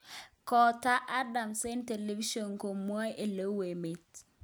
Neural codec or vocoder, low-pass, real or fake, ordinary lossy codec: none; none; real; none